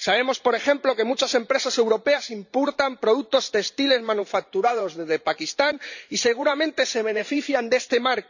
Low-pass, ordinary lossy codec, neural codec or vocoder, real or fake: 7.2 kHz; none; vocoder, 44.1 kHz, 128 mel bands every 512 samples, BigVGAN v2; fake